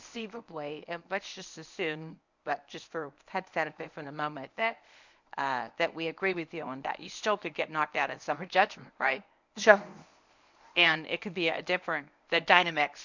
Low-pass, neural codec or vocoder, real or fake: 7.2 kHz; codec, 24 kHz, 0.9 kbps, WavTokenizer, medium speech release version 1; fake